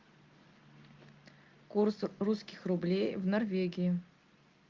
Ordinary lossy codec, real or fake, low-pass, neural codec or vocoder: Opus, 24 kbps; real; 7.2 kHz; none